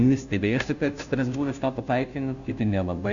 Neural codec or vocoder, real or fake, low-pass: codec, 16 kHz, 0.5 kbps, FunCodec, trained on Chinese and English, 25 frames a second; fake; 7.2 kHz